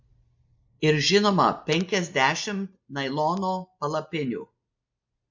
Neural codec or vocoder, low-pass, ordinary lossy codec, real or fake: none; 7.2 kHz; MP3, 48 kbps; real